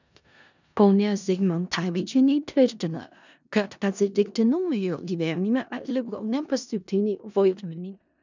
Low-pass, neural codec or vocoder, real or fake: 7.2 kHz; codec, 16 kHz in and 24 kHz out, 0.4 kbps, LongCat-Audio-Codec, four codebook decoder; fake